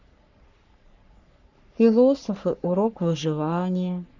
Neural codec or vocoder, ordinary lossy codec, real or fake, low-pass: codec, 44.1 kHz, 3.4 kbps, Pupu-Codec; none; fake; 7.2 kHz